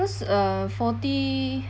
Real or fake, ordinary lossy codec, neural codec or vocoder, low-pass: real; none; none; none